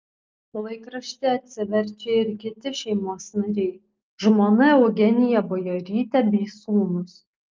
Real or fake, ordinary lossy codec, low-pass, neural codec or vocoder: real; Opus, 24 kbps; 7.2 kHz; none